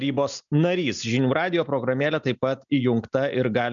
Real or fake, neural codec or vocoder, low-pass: real; none; 7.2 kHz